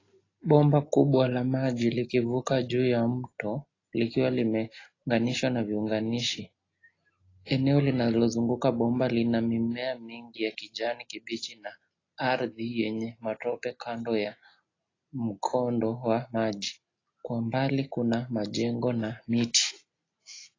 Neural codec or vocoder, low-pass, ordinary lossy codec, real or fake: none; 7.2 kHz; AAC, 32 kbps; real